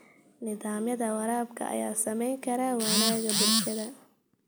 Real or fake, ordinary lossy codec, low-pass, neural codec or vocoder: real; none; none; none